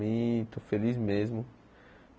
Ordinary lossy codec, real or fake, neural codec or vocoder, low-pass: none; real; none; none